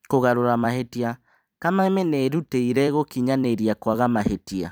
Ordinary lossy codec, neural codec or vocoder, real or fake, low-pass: none; vocoder, 44.1 kHz, 128 mel bands every 256 samples, BigVGAN v2; fake; none